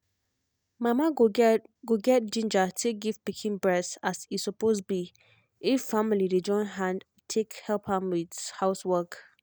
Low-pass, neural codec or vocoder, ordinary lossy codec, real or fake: none; none; none; real